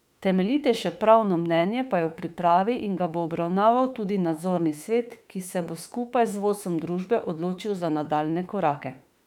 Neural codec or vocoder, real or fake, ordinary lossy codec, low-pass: autoencoder, 48 kHz, 32 numbers a frame, DAC-VAE, trained on Japanese speech; fake; none; 19.8 kHz